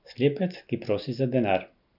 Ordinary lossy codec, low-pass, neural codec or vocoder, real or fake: none; 5.4 kHz; none; real